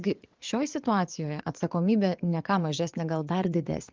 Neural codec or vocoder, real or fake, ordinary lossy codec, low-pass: vocoder, 22.05 kHz, 80 mel bands, WaveNeXt; fake; Opus, 24 kbps; 7.2 kHz